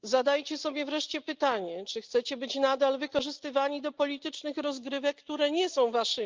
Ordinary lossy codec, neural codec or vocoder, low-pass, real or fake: Opus, 32 kbps; none; 7.2 kHz; real